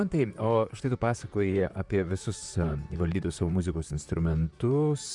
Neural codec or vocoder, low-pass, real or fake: vocoder, 44.1 kHz, 128 mel bands, Pupu-Vocoder; 10.8 kHz; fake